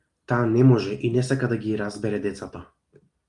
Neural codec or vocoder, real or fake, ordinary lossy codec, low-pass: none; real; Opus, 32 kbps; 10.8 kHz